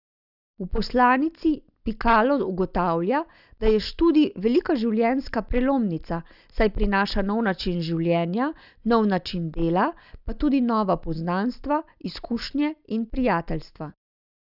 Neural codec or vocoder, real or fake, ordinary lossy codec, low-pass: none; real; none; 5.4 kHz